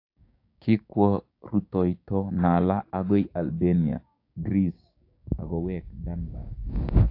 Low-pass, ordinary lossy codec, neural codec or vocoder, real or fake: 5.4 kHz; AAC, 32 kbps; codec, 16 kHz, 6 kbps, DAC; fake